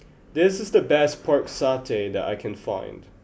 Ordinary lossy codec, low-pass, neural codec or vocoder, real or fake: none; none; none; real